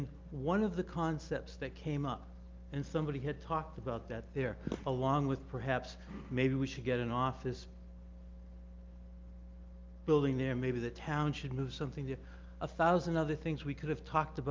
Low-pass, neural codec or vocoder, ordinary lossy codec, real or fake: 7.2 kHz; none; Opus, 32 kbps; real